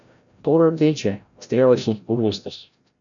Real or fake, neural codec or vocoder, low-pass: fake; codec, 16 kHz, 0.5 kbps, FreqCodec, larger model; 7.2 kHz